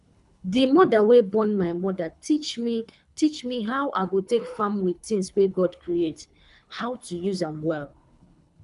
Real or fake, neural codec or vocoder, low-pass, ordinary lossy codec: fake; codec, 24 kHz, 3 kbps, HILCodec; 10.8 kHz; none